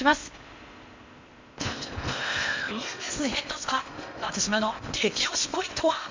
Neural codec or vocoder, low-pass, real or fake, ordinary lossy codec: codec, 16 kHz in and 24 kHz out, 0.8 kbps, FocalCodec, streaming, 65536 codes; 7.2 kHz; fake; MP3, 64 kbps